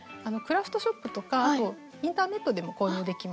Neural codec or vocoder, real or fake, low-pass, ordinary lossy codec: none; real; none; none